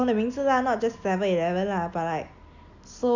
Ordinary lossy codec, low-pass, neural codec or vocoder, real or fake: none; 7.2 kHz; none; real